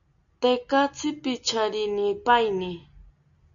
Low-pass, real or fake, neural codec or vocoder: 7.2 kHz; real; none